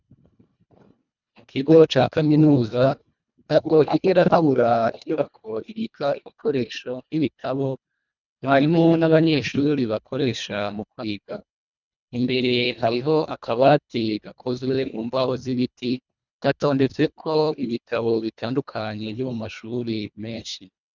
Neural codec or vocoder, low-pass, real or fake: codec, 24 kHz, 1.5 kbps, HILCodec; 7.2 kHz; fake